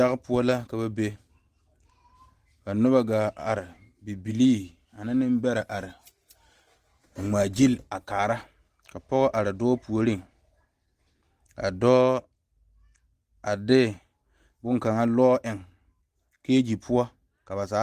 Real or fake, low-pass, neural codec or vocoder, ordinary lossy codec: real; 14.4 kHz; none; Opus, 24 kbps